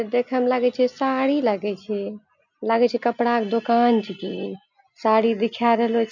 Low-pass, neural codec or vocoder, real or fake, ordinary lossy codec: 7.2 kHz; none; real; none